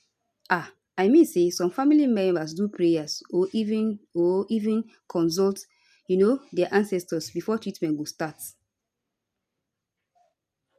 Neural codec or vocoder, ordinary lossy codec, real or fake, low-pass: none; none; real; 14.4 kHz